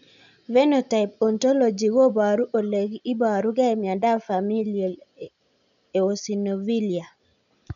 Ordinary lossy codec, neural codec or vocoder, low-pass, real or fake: none; none; 7.2 kHz; real